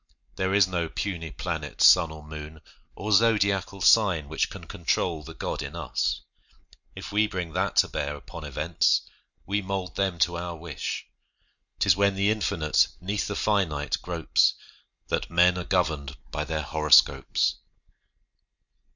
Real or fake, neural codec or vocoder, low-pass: real; none; 7.2 kHz